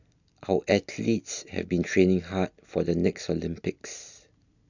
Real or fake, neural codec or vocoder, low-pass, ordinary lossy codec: real; none; 7.2 kHz; none